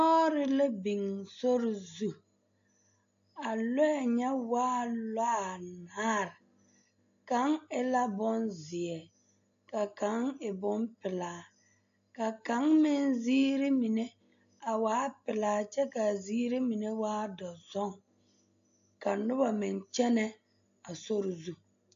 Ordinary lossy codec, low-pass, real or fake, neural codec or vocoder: AAC, 48 kbps; 7.2 kHz; real; none